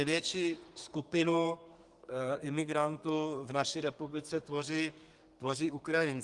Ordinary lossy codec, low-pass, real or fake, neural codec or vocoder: Opus, 24 kbps; 10.8 kHz; fake; codec, 32 kHz, 1.9 kbps, SNAC